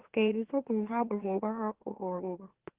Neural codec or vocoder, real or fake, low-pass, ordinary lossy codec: autoencoder, 44.1 kHz, a latent of 192 numbers a frame, MeloTTS; fake; 3.6 kHz; Opus, 16 kbps